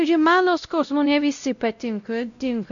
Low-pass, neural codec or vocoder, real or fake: 7.2 kHz; codec, 16 kHz, 0.5 kbps, X-Codec, WavLM features, trained on Multilingual LibriSpeech; fake